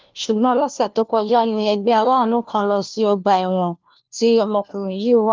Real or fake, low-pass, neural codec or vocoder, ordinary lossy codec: fake; 7.2 kHz; codec, 16 kHz, 1 kbps, FunCodec, trained on LibriTTS, 50 frames a second; Opus, 32 kbps